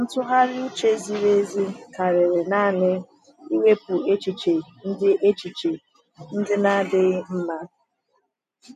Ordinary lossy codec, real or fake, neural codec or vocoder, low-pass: none; real; none; 14.4 kHz